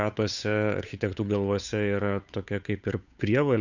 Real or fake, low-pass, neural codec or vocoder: fake; 7.2 kHz; codec, 16 kHz, 8 kbps, FunCodec, trained on Chinese and English, 25 frames a second